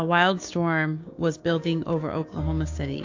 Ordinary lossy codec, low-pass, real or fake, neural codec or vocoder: AAC, 48 kbps; 7.2 kHz; fake; codec, 16 kHz, 6 kbps, DAC